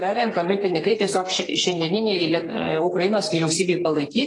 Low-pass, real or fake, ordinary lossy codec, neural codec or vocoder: 10.8 kHz; fake; AAC, 32 kbps; codec, 32 kHz, 1.9 kbps, SNAC